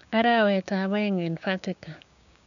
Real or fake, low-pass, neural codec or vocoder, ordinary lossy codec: fake; 7.2 kHz; codec, 16 kHz, 6 kbps, DAC; none